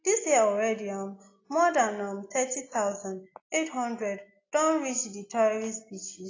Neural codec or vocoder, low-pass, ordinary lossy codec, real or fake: none; 7.2 kHz; AAC, 32 kbps; real